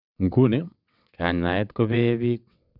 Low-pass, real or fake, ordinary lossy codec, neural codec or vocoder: 5.4 kHz; fake; none; vocoder, 22.05 kHz, 80 mel bands, WaveNeXt